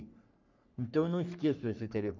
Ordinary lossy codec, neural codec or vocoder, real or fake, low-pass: none; codec, 44.1 kHz, 3.4 kbps, Pupu-Codec; fake; 7.2 kHz